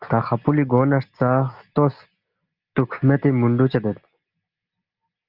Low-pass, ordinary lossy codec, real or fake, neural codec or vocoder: 5.4 kHz; Opus, 24 kbps; real; none